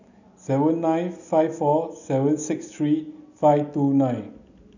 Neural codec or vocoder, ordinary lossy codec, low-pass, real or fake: none; none; 7.2 kHz; real